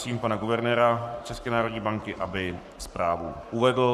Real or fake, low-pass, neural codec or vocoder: fake; 14.4 kHz; codec, 44.1 kHz, 7.8 kbps, Pupu-Codec